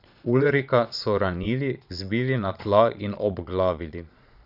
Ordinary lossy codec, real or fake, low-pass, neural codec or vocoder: none; fake; 5.4 kHz; vocoder, 22.05 kHz, 80 mel bands, Vocos